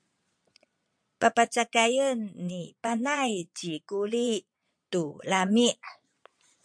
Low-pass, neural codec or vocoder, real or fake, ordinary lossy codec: 9.9 kHz; vocoder, 22.05 kHz, 80 mel bands, Vocos; fake; MP3, 64 kbps